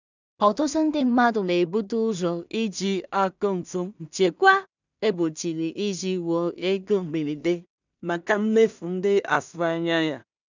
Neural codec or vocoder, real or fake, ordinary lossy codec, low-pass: codec, 16 kHz in and 24 kHz out, 0.4 kbps, LongCat-Audio-Codec, two codebook decoder; fake; none; 7.2 kHz